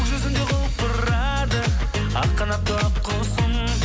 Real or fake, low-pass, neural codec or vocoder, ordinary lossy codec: real; none; none; none